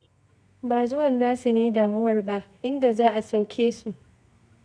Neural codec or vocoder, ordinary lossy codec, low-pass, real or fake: codec, 24 kHz, 0.9 kbps, WavTokenizer, medium music audio release; none; 9.9 kHz; fake